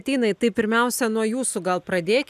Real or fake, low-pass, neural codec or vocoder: real; 14.4 kHz; none